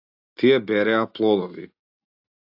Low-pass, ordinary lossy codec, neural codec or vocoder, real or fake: 5.4 kHz; AAC, 48 kbps; none; real